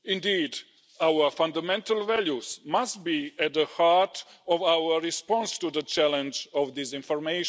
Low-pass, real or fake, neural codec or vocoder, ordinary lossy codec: none; real; none; none